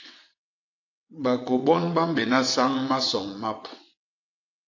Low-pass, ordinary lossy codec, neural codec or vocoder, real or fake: 7.2 kHz; AAC, 48 kbps; vocoder, 22.05 kHz, 80 mel bands, WaveNeXt; fake